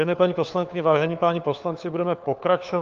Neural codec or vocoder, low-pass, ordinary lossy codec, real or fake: codec, 16 kHz, 4 kbps, FunCodec, trained on LibriTTS, 50 frames a second; 7.2 kHz; Opus, 24 kbps; fake